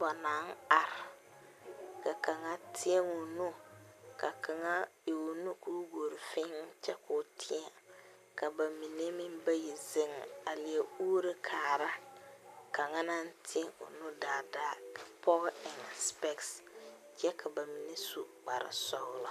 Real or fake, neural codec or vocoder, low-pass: real; none; 14.4 kHz